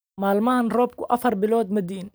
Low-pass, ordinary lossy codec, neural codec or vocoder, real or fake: none; none; none; real